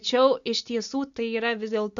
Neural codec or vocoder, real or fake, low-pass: none; real; 7.2 kHz